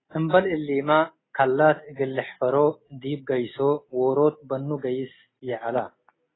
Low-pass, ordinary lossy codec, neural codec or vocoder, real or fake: 7.2 kHz; AAC, 16 kbps; none; real